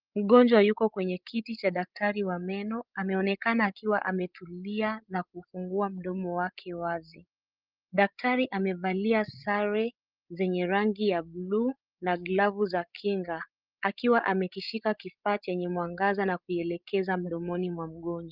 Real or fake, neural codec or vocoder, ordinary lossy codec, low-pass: fake; codec, 16 kHz, 16 kbps, FreqCodec, larger model; Opus, 32 kbps; 5.4 kHz